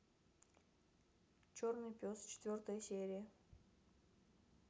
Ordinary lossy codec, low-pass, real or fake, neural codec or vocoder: none; none; real; none